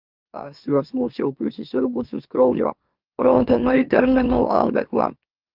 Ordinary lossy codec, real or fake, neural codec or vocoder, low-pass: Opus, 32 kbps; fake; autoencoder, 44.1 kHz, a latent of 192 numbers a frame, MeloTTS; 5.4 kHz